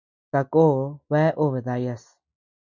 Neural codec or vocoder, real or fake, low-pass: none; real; 7.2 kHz